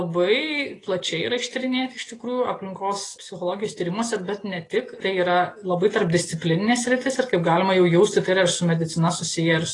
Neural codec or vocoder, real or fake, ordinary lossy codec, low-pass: none; real; AAC, 32 kbps; 10.8 kHz